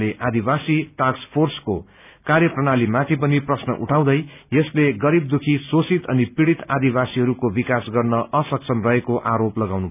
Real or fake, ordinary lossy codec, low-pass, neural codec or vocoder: real; none; 3.6 kHz; none